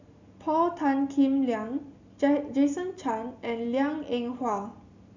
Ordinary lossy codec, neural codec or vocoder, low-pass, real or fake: none; none; 7.2 kHz; real